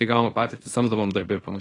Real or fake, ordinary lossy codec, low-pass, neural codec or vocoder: fake; AAC, 32 kbps; 10.8 kHz; codec, 24 kHz, 0.9 kbps, WavTokenizer, small release